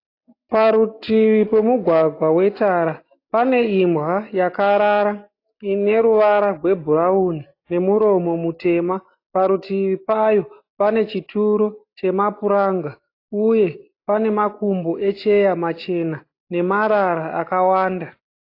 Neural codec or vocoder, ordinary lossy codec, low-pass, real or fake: none; AAC, 32 kbps; 5.4 kHz; real